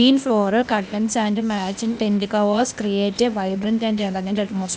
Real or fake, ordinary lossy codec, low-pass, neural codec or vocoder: fake; none; none; codec, 16 kHz, 0.8 kbps, ZipCodec